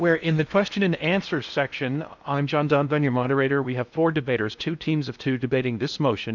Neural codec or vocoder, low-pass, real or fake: codec, 16 kHz in and 24 kHz out, 0.8 kbps, FocalCodec, streaming, 65536 codes; 7.2 kHz; fake